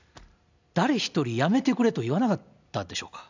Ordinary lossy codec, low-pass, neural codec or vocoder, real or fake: none; 7.2 kHz; none; real